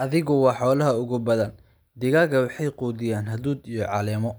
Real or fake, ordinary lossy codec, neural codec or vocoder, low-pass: real; none; none; none